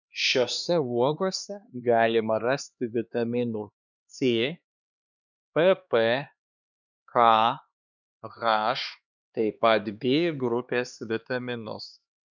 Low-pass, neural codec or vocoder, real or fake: 7.2 kHz; codec, 16 kHz, 2 kbps, X-Codec, HuBERT features, trained on LibriSpeech; fake